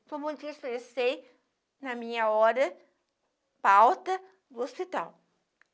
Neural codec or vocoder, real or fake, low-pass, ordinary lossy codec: none; real; none; none